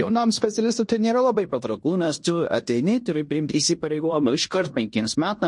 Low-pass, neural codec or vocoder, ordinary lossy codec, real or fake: 10.8 kHz; codec, 16 kHz in and 24 kHz out, 0.9 kbps, LongCat-Audio-Codec, fine tuned four codebook decoder; MP3, 48 kbps; fake